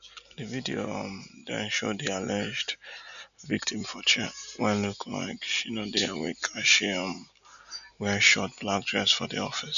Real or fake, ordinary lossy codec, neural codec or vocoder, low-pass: real; none; none; 7.2 kHz